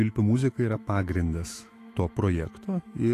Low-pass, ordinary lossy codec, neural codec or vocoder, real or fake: 14.4 kHz; AAC, 48 kbps; autoencoder, 48 kHz, 128 numbers a frame, DAC-VAE, trained on Japanese speech; fake